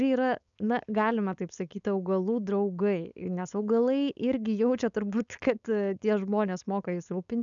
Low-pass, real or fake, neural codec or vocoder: 7.2 kHz; fake; codec, 16 kHz, 4.8 kbps, FACodec